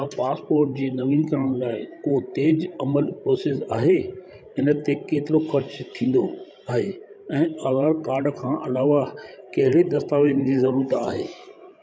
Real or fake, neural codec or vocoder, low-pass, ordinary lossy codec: fake; codec, 16 kHz, 16 kbps, FreqCodec, larger model; none; none